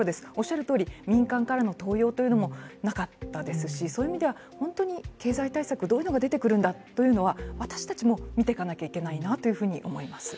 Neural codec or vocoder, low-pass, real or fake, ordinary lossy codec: none; none; real; none